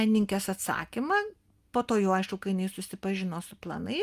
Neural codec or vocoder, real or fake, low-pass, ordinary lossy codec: none; real; 14.4 kHz; Opus, 32 kbps